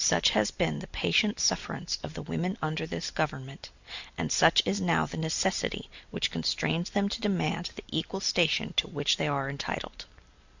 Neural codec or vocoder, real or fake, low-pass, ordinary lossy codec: none; real; 7.2 kHz; Opus, 64 kbps